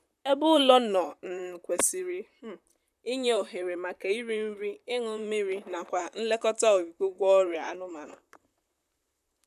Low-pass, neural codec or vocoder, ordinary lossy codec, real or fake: 14.4 kHz; vocoder, 44.1 kHz, 128 mel bands, Pupu-Vocoder; none; fake